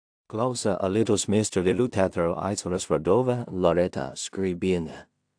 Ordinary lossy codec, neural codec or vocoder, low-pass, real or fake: AAC, 64 kbps; codec, 16 kHz in and 24 kHz out, 0.4 kbps, LongCat-Audio-Codec, two codebook decoder; 9.9 kHz; fake